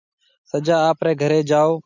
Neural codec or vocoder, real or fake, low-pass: none; real; 7.2 kHz